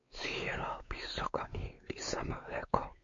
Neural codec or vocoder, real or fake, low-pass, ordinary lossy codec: codec, 16 kHz, 4 kbps, X-Codec, WavLM features, trained on Multilingual LibriSpeech; fake; 7.2 kHz; MP3, 48 kbps